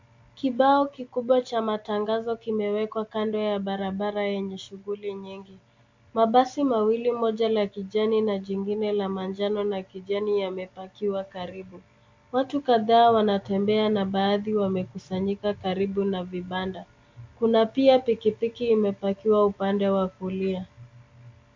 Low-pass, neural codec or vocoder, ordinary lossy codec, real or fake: 7.2 kHz; none; MP3, 48 kbps; real